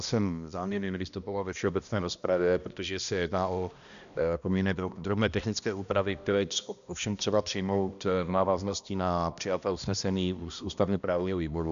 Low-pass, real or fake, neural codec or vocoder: 7.2 kHz; fake; codec, 16 kHz, 1 kbps, X-Codec, HuBERT features, trained on balanced general audio